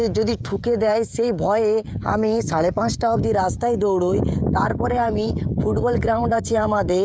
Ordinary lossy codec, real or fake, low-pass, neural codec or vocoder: none; fake; none; codec, 16 kHz, 16 kbps, FreqCodec, smaller model